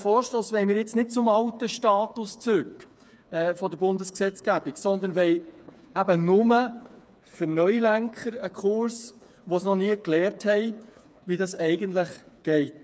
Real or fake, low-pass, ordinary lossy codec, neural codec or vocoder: fake; none; none; codec, 16 kHz, 4 kbps, FreqCodec, smaller model